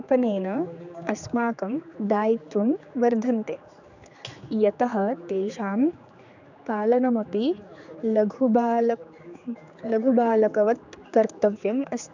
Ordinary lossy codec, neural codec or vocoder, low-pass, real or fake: none; codec, 16 kHz, 4 kbps, X-Codec, HuBERT features, trained on general audio; 7.2 kHz; fake